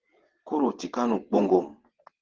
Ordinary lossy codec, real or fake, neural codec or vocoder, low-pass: Opus, 16 kbps; fake; vocoder, 22.05 kHz, 80 mel bands, WaveNeXt; 7.2 kHz